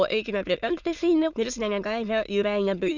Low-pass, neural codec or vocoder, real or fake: 7.2 kHz; autoencoder, 22.05 kHz, a latent of 192 numbers a frame, VITS, trained on many speakers; fake